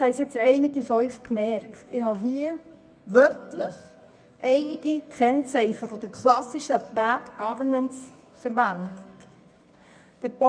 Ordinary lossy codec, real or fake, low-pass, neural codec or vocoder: none; fake; 9.9 kHz; codec, 24 kHz, 0.9 kbps, WavTokenizer, medium music audio release